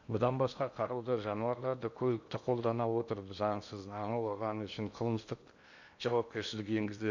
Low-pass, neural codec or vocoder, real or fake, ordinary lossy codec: 7.2 kHz; codec, 16 kHz in and 24 kHz out, 0.8 kbps, FocalCodec, streaming, 65536 codes; fake; none